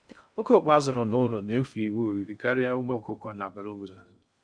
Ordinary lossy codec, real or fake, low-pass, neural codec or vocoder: none; fake; 9.9 kHz; codec, 16 kHz in and 24 kHz out, 0.6 kbps, FocalCodec, streaming, 2048 codes